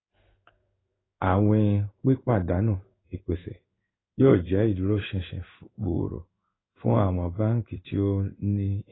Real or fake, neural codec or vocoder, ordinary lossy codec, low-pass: fake; codec, 16 kHz in and 24 kHz out, 1 kbps, XY-Tokenizer; AAC, 16 kbps; 7.2 kHz